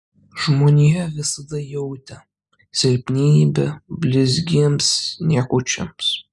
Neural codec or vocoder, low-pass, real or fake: none; 10.8 kHz; real